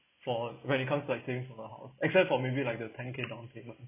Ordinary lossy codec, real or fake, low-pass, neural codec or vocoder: none; real; 3.6 kHz; none